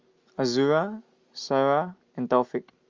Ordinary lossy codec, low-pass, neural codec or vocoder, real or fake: Opus, 32 kbps; 7.2 kHz; none; real